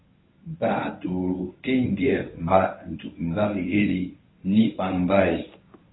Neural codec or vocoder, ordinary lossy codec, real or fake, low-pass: codec, 24 kHz, 0.9 kbps, WavTokenizer, medium speech release version 1; AAC, 16 kbps; fake; 7.2 kHz